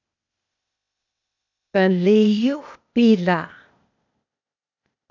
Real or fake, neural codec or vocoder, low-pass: fake; codec, 16 kHz, 0.8 kbps, ZipCodec; 7.2 kHz